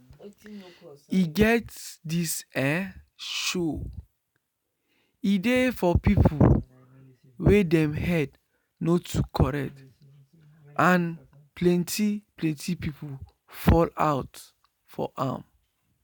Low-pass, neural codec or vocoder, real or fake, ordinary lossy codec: none; none; real; none